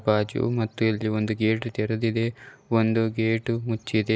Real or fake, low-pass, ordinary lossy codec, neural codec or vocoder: real; none; none; none